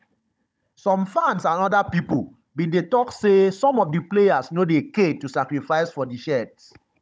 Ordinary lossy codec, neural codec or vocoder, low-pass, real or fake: none; codec, 16 kHz, 16 kbps, FunCodec, trained on Chinese and English, 50 frames a second; none; fake